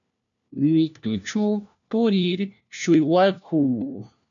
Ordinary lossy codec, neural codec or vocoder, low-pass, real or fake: MP3, 96 kbps; codec, 16 kHz, 1 kbps, FunCodec, trained on LibriTTS, 50 frames a second; 7.2 kHz; fake